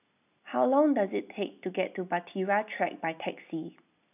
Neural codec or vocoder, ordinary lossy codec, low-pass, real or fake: none; none; 3.6 kHz; real